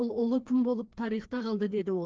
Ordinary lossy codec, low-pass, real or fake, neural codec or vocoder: Opus, 16 kbps; 7.2 kHz; fake; codec, 16 kHz, 2 kbps, FreqCodec, larger model